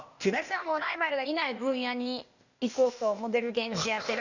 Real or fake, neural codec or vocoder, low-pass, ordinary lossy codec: fake; codec, 16 kHz, 0.8 kbps, ZipCodec; 7.2 kHz; none